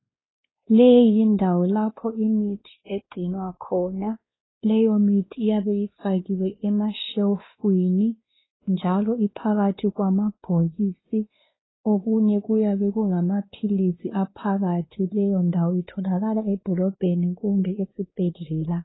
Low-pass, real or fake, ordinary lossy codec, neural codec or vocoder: 7.2 kHz; fake; AAC, 16 kbps; codec, 16 kHz, 2 kbps, X-Codec, WavLM features, trained on Multilingual LibriSpeech